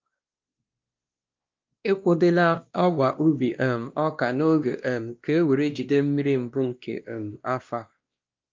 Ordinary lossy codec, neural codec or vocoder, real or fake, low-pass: Opus, 24 kbps; codec, 16 kHz, 1 kbps, X-Codec, WavLM features, trained on Multilingual LibriSpeech; fake; 7.2 kHz